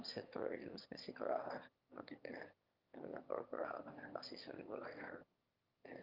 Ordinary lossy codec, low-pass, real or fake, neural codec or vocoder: Opus, 24 kbps; 5.4 kHz; fake; autoencoder, 22.05 kHz, a latent of 192 numbers a frame, VITS, trained on one speaker